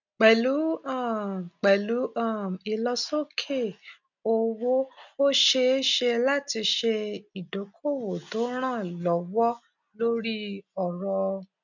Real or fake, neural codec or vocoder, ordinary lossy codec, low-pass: real; none; none; 7.2 kHz